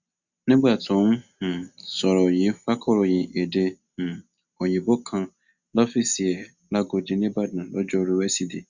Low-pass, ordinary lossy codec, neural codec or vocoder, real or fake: 7.2 kHz; Opus, 64 kbps; none; real